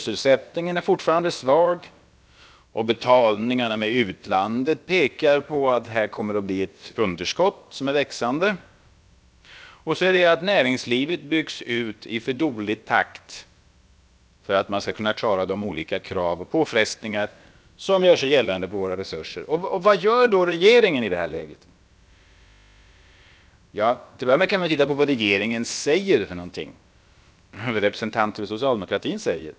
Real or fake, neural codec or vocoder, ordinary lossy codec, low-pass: fake; codec, 16 kHz, about 1 kbps, DyCAST, with the encoder's durations; none; none